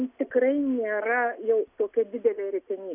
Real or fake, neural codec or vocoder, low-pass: real; none; 3.6 kHz